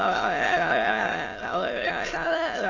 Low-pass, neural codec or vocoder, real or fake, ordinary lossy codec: 7.2 kHz; autoencoder, 22.05 kHz, a latent of 192 numbers a frame, VITS, trained on many speakers; fake; AAC, 32 kbps